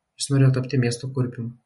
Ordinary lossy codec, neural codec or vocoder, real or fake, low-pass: MP3, 48 kbps; none; real; 19.8 kHz